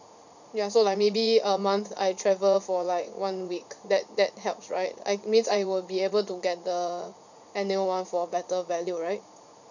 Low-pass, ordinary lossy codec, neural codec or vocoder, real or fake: 7.2 kHz; none; vocoder, 44.1 kHz, 80 mel bands, Vocos; fake